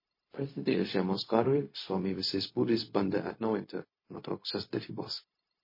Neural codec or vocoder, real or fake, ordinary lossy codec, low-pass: codec, 16 kHz, 0.4 kbps, LongCat-Audio-Codec; fake; MP3, 24 kbps; 5.4 kHz